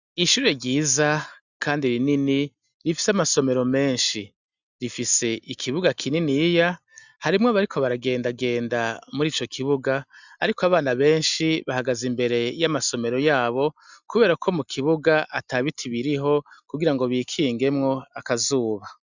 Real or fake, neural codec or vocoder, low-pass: real; none; 7.2 kHz